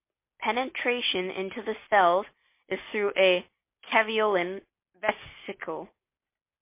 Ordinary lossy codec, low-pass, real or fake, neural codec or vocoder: MP3, 24 kbps; 3.6 kHz; real; none